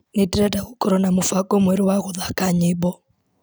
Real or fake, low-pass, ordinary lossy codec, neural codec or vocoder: real; none; none; none